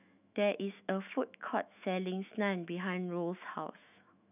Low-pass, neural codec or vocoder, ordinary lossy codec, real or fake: 3.6 kHz; autoencoder, 48 kHz, 128 numbers a frame, DAC-VAE, trained on Japanese speech; none; fake